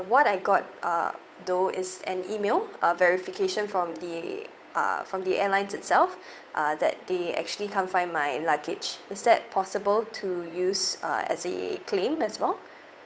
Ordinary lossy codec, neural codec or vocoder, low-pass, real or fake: none; codec, 16 kHz, 8 kbps, FunCodec, trained on Chinese and English, 25 frames a second; none; fake